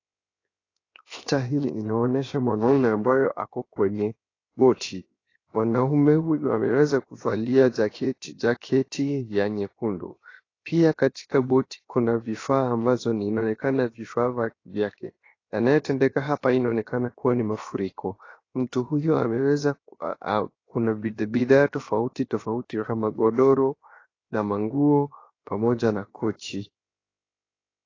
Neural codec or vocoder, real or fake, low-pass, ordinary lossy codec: codec, 16 kHz, 0.7 kbps, FocalCodec; fake; 7.2 kHz; AAC, 32 kbps